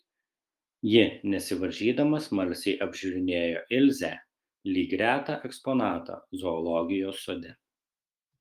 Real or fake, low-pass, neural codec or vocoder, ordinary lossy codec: fake; 14.4 kHz; autoencoder, 48 kHz, 128 numbers a frame, DAC-VAE, trained on Japanese speech; Opus, 32 kbps